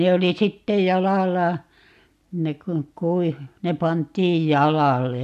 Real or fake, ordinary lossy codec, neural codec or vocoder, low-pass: real; MP3, 96 kbps; none; 14.4 kHz